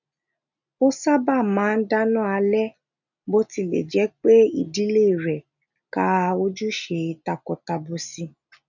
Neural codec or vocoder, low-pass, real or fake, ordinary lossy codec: none; 7.2 kHz; real; none